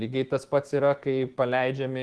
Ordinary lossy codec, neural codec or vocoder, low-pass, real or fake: Opus, 16 kbps; autoencoder, 48 kHz, 128 numbers a frame, DAC-VAE, trained on Japanese speech; 10.8 kHz; fake